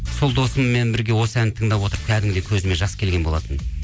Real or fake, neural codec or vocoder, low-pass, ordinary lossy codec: real; none; none; none